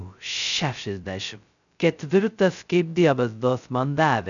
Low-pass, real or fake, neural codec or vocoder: 7.2 kHz; fake; codec, 16 kHz, 0.2 kbps, FocalCodec